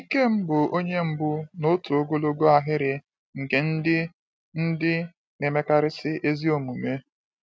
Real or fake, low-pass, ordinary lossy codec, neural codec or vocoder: real; none; none; none